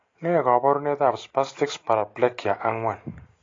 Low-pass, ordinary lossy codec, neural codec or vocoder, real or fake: 7.2 kHz; AAC, 32 kbps; none; real